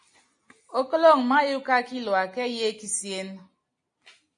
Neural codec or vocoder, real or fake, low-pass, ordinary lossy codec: none; real; 9.9 kHz; AAC, 48 kbps